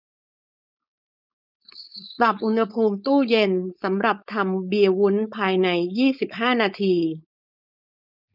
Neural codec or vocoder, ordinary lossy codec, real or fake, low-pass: codec, 16 kHz, 4.8 kbps, FACodec; none; fake; 5.4 kHz